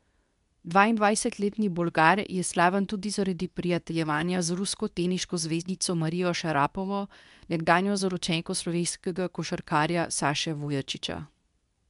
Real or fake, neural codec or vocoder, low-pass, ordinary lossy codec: fake; codec, 24 kHz, 0.9 kbps, WavTokenizer, medium speech release version 2; 10.8 kHz; none